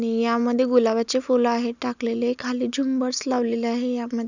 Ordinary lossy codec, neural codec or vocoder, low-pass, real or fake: none; none; 7.2 kHz; real